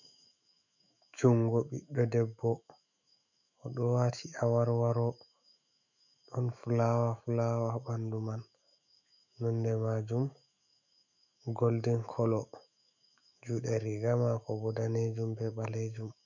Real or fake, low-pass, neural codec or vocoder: fake; 7.2 kHz; autoencoder, 48 kHz, 128 numbers a frame, DAC-VAE, trained on Japanese speech